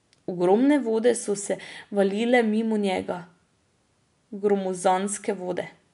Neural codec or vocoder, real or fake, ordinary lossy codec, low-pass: none; real; none; 10.8 kHz